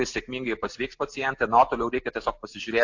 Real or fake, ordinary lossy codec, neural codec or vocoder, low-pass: real; AAC, 48 kbps; none; 7.2 kHz